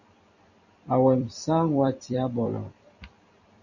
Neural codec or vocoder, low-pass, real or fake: vocoder, 44.1 kHz, 128 mel bands every 256 samples, BigVGAN v2; 7.2 kHz; fake